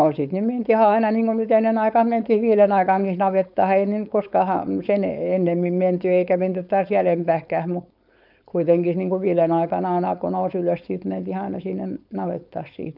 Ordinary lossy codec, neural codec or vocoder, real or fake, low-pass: none; codec, 16 kHz, 4.8 kbps, FACodec; fake; 5.4 kHz